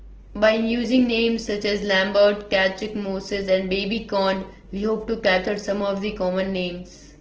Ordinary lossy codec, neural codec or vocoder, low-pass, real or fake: Opus, 16 kbps; none; 7.2 kHz; real